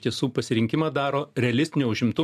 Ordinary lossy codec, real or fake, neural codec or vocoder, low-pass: AAC, 96 kbps; real; none; 14.4 kHz